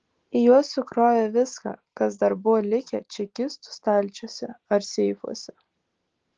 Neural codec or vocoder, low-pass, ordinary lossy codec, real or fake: none; 7.2 kHz; Opus, 16 kbps; real